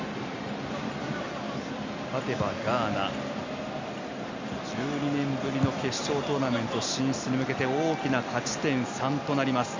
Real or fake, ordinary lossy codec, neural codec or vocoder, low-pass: real; MP3, 64 kbps; none; 7.2 kHz